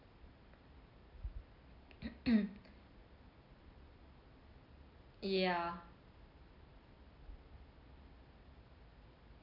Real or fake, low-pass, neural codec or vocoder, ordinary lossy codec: real; 5.4 kHz; none; none